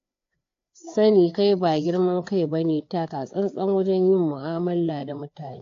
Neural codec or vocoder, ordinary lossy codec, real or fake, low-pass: codec, 16 kHz, 4 kbps, FreqCodec, larger model; AAC, 48 kbps; fake; 7.2 kHz